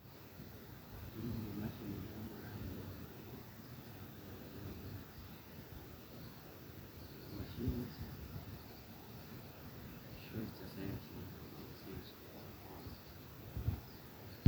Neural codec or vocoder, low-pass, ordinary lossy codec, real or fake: vocoder, 44.1 kHz, 128 mel bands every 256 samples, BigVGAN v2; none; none; fake